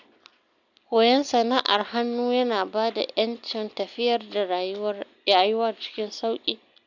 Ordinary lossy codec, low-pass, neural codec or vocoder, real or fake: none; 7.2 kHz; none; real